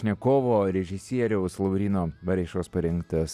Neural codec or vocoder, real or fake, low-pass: none; real; 14.4 kHz